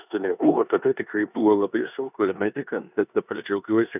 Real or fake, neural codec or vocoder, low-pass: fake; codec, 16 kHz in and 24 kHz out, 0.9 kbps, LongCat-Audio-Codec, four codebook decoder; 3.6 kHz